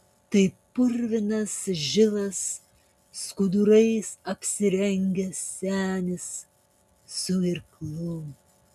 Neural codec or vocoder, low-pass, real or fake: none; 14.4 kHz; real